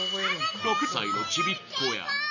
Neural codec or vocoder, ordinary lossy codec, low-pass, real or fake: none; none; 7.2 kHz; real